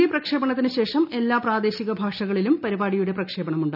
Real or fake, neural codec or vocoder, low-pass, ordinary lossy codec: real; none; 5.4 kHz; none